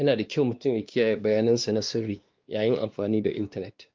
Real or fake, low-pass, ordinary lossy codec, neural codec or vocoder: fake; 7.2 kHz; Opus, 24 kbps; codec, 16 kHz, 2 kbps, X-Codec, WavLM features, trained on Multilingual LibriSpeech